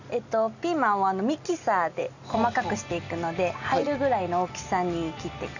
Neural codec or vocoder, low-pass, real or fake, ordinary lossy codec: none; 7.2 kHz; real; none